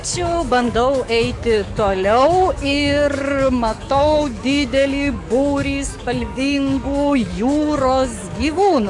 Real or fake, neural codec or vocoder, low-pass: fake; codec, 44.1 kHz, 7.8 kbps, DAC; 10.8 kHz